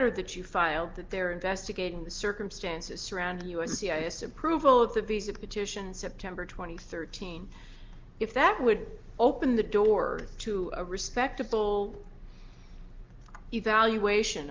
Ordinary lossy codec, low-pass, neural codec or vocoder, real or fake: Opus, 32 kbps; 7.2 kHz; none; real